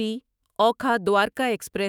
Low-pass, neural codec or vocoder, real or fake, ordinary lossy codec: none; autoencoder, 48 kHz, 128 numbers a frame, DAC-VAE, trained on Japanese speech; fake; none